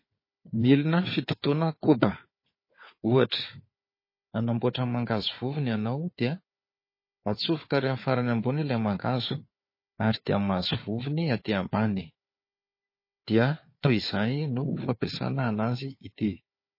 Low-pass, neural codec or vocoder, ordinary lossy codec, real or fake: 5.4 kHz; codec, 16 kHz, 4 kbps, FunCodec, trained on Chinese and English, 50 frames a second; MP3, 24 kbps; fake